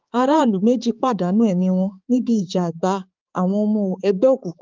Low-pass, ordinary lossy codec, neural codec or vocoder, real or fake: 7.2 kHz; Opus, 32 kbps; codec, 16 kHz, 4 kbps, X-Codec, HuBERT features, trained on balanced general audio; fake